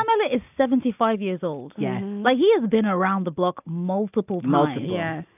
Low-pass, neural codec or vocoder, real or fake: 3.6 kHz; none; real